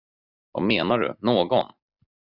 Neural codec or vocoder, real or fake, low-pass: none; real; 5.4 kHz